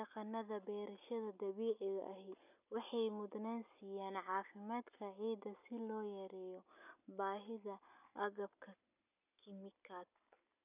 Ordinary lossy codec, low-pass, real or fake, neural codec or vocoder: none; 3.6 kHz; real; none